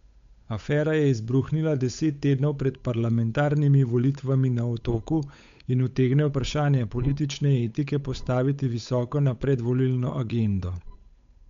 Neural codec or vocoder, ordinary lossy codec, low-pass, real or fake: codec, 16 kHz, 8 kbps, FunCodec, trained on Chinese and English, 25 frames a second; MP3, 64 kbps; 7.2 kHz; fake